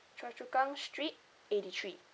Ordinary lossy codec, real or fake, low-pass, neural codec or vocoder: none; real; none; none